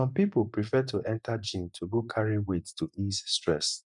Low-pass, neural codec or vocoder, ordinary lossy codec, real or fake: 10.8 kHz; vocoder, 24 kHz, 100 mel bands, Vocos; none; fake